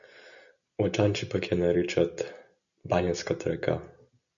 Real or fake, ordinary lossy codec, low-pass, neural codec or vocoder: real; MP3, 96 kbps; 7.2 kHz; none